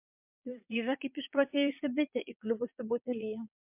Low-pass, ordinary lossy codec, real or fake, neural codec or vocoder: 3.6 kHz; MP3, 32 kbps; fake; vocoder, 22.05 kHz, 80 mel bands, Vocos